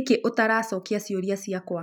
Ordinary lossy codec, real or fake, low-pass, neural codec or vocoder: none; real; 19.8 kHz; none